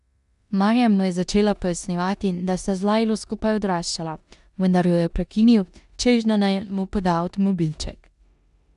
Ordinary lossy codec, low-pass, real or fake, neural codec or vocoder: none; 10.8 kHz; fake; codec, 16 kHz in and 24 kHz out, 0.9 kbps, LongCat-Audio-Codec, four codebook decoder